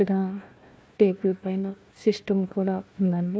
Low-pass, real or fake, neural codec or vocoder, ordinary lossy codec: none; fake; codec, 16 kHz, 1 kbps, FunCodec, trained on Chinese and English, 50 frames a second; none